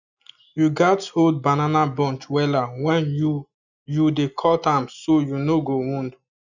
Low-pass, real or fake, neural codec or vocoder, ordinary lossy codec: 7.2 kHz; real; none; none